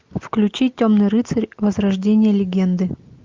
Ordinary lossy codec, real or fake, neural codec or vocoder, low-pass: Opus, 24 kbps; real; none; 7.2 kHz